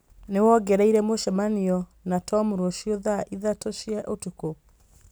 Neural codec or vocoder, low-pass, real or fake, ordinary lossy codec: none; none; real; none